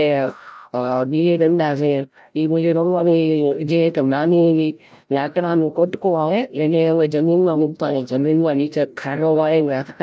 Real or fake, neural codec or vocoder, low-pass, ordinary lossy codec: fake; codec, 16 kHz, 0.5 kbps, FreqCodec, larger model; none; none